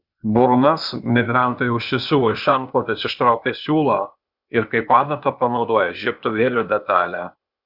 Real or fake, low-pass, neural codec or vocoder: fake; 5.4 kHz; codec, 16 kHz, 0.8 kbps, ZipCodec